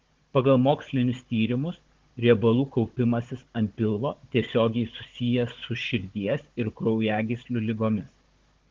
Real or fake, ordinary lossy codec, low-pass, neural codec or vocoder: fake; Opus, 16 kbps; 7.2 kHz; codec, 16 kHz, 16 kbps, FunCodec, trained on Chinese and English, 50 frames a second